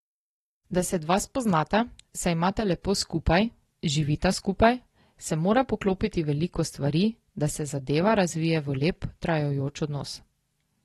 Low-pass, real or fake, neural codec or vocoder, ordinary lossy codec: 19.8 kHz; real; none; AAC, 32 kbps